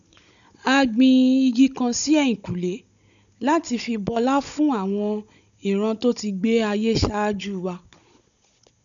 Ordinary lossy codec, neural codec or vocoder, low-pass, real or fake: none; codec, 16 kHz, 16 kbps, FunCodec, trained on Chinese and English, 50 frames a second; 7.2 kHz; fake